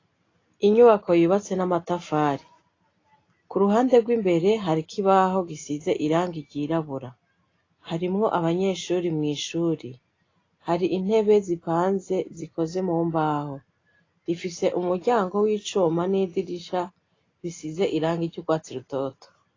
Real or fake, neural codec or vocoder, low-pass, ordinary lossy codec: real; none; 7.2 kHz; AAC, 32 kbps